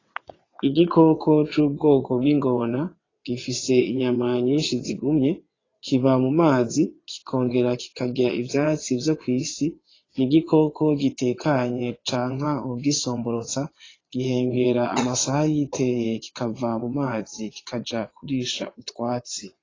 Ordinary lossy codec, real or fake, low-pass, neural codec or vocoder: AAC, 32 kbps; fake; 7.2 kHz; vocoder, 22.05 kHz, 80 mel bands, WaveNeXt